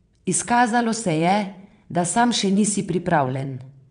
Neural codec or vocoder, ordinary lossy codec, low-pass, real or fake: vocoder, 22.05 kHz, 80 mel bands, WaveNeXt; none; 9.9 kHz; fake